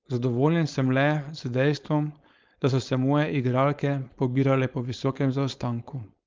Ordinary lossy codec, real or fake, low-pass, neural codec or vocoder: Opus, 32 kbps; fake; 7.2 kHz; codec, 16 kHz, 4.8 kbps, FACodec